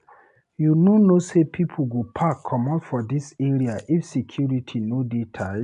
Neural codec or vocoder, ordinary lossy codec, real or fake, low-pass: none; none; real; 10.8 kHz